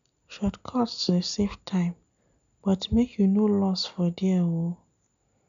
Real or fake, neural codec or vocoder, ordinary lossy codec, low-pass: real; none; none; 7.2 kHz